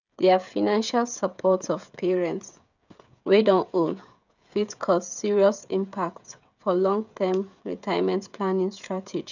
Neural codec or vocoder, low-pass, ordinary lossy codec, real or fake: codec, 16 kHz, 16 kbps, FreqCodec, smaller model; 7.2 kHz; none; fake